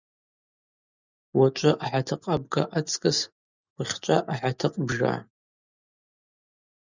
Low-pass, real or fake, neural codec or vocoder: 7.2 kHz; real; none